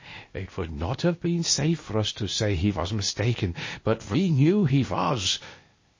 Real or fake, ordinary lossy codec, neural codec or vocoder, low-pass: fake; MP3, 32 kbps; codec, 16 kHz, 0.8 kbps, ZipCodec; 7.2 kHz